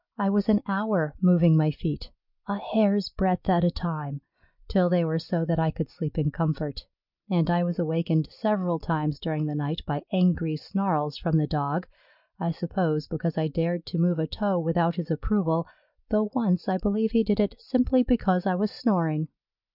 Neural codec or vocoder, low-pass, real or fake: none; 5.4 kHz; real